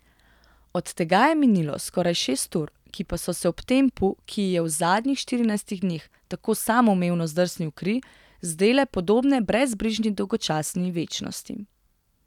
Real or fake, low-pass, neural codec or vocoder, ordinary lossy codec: real; 19.8 kHz; none; none